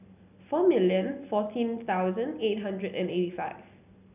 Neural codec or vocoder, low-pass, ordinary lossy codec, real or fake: none; 3.6 kHz; none; real